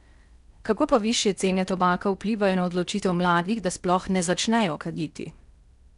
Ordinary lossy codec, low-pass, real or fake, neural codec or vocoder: none; 10.8 kHz; fake; codec, 16 kHz in and 24 kHz out, 0.8 kbps, FocalCodec, streaming, 65536 codes